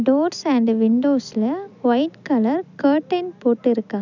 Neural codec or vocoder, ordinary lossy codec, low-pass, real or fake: none; none; 7.2 kHz; real